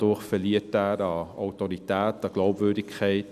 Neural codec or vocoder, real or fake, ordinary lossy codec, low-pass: none; real; none; 14.4 kHz